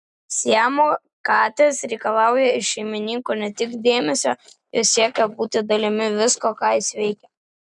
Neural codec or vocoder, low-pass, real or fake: none; 10.8 kHz; real